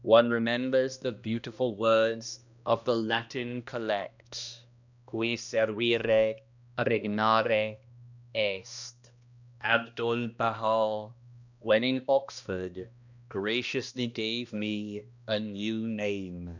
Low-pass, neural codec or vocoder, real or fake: 7.2 kHz; codec, 16 kHz, 1 kbps, X-Codec, HuBERT features, trained on balanced general audio; fake